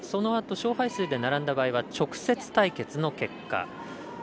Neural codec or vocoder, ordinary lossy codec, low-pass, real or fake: none; none; none; real